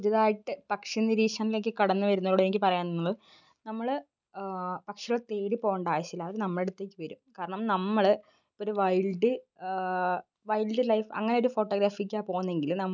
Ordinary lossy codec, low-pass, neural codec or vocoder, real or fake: none; 7.2 kHz; none; real